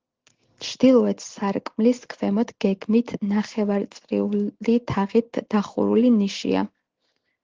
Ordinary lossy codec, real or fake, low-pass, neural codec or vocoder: Opus, 16 kbps; real; 7.2 kHz; none